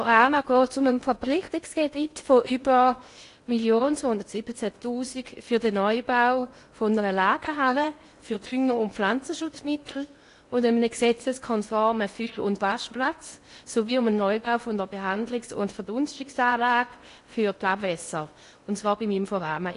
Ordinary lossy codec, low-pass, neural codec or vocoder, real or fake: AAC, 48 kbps; 10.8 kHz; codec, 16 kHz in and 24 kHz out, 0.8 kbps, FocalCodec, streaming, 65536 codes; fake